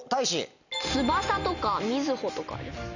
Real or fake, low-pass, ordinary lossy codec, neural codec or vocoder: real; 7.2 kHz; none; none